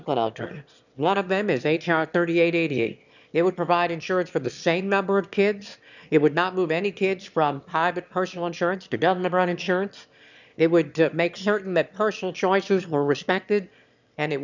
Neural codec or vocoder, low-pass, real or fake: autoencoder, 22.05 kHz, a latent of 192 numbers a frame, VITS, trained on one speaker; 7.2 kHz; fake